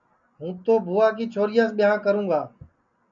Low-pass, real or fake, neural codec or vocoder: 7.2 kHz; real; none